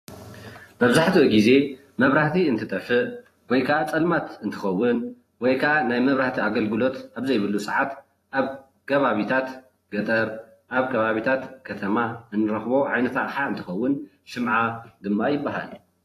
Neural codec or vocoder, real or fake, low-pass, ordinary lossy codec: vocoder, 44.1 kHz, 128 mel bands every 256 samples, BigVGAN v2; fake; 14.4 kHz; AAC, 48 kbps